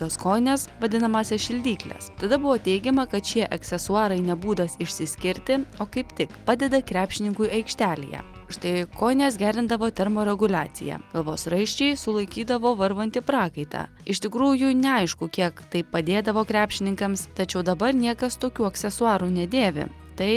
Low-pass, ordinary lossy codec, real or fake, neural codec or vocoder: 14.4 kHz; Opus, 32 kbps; real; none